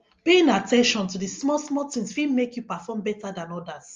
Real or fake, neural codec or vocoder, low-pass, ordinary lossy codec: real; none; 7.2 kHz; Opus, 32 kbps